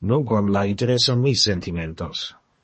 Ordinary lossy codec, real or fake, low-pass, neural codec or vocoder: MP3, 32 kbps; fake; 10.8 kHz; codec, 24 kHz, 1 kbps, SNAC